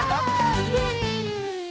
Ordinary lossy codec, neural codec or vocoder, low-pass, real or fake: none; codec, 16 kHz, 1 kbps, X-Codec, HuBERT features, trained on balanced general audio; none; fake